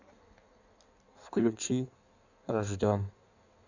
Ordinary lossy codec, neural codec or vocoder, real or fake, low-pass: none; codec, 16 kHz in and 24 kHz out, 1.1 kbps, FireRedTTS-2 codec; fake; 7.2 kHz